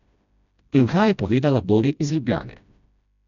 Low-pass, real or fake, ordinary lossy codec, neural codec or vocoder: 7.2 kHz; fake; none; codec, 16 kHz, 1 kbps, FreqCodec, smaller model